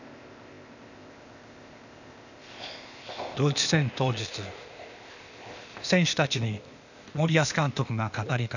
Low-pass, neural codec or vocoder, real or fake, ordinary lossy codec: 7.2 kHz; codec, 16 kHz, 0.8 kbps, ZipCodec; fake; none